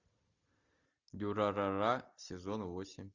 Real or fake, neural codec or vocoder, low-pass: real; none; 7.2 kHz